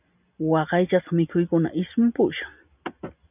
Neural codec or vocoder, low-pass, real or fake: none; 3.6 kHz; real